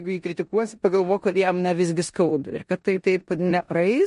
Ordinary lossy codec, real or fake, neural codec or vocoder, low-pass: MP3, 48 kbps; fake; codec, 16 kHz in and 24 kHz out, 0.9 kbps, LongCat-Audio-Codec, four codebook decoder; 10.8 kHz